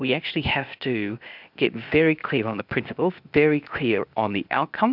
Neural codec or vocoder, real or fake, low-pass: codec, 16 kHz, 0.8 kbps, ZipCodec; fake; 5.4 kHz